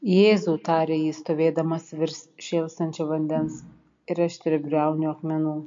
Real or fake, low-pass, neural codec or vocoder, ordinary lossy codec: real; 7.2 kHz; none; MP3, 48 kbps